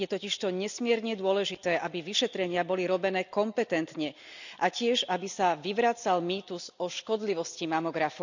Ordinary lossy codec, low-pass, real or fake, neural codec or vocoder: none; 7.2 kHz; real; none